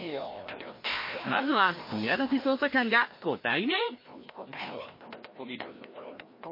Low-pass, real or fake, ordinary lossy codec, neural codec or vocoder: 5.4 kHz; fake; MP3, 24 kbps; codec, 16 kHz, 1 kbps, FreqCodec, larger model